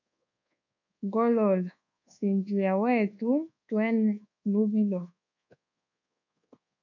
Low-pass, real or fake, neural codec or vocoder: 7.2 kHz; fake; codec, 24 kHz, 1.2 kbps, DualCodec